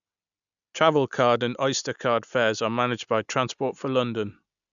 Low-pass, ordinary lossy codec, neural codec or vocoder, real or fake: 7.2 kHz; none; none; real